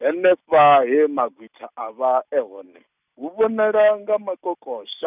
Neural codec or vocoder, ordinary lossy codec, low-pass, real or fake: none; none; 3.6 kHz; real